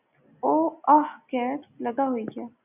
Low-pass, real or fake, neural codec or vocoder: 3.6 kHz; real; none